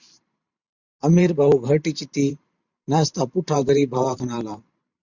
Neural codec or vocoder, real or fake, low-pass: vocoder, 44.1 kHz, 128 mel bands every 512 samples, BigVGAN v2; fake; 7.2 kHz